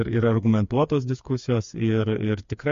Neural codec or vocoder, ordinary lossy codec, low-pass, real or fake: codec, 16 kHz, 4 kbps, FreqCodec, smaller model; MP3, 48 kbps; 7.2 kHz; fake